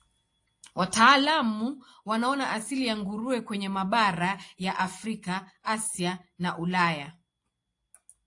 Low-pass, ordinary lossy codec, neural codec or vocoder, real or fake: 10.8 kHz; AAC, 48 kbps; none; real